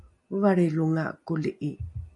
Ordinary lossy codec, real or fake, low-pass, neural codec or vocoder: MP3, 48 kbps; real; 10.8 kHz; none